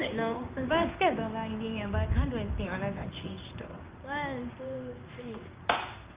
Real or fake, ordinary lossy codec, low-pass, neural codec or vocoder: fake; Opus, 24 kbps; 3.6 kHz; codec, 16 kHz in and 24 kHz out, 1 kbps, XY-Tokenizer